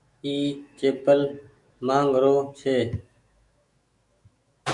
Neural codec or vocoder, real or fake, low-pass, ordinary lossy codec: autoencoder, 48 kHz, 128 numbers a frame, DAC-VAE, trained on Japanese speech; fake; 10.8 kHz; Opus, 64 kbps